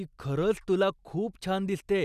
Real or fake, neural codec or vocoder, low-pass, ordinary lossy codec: real; none; 14.4 kHz; none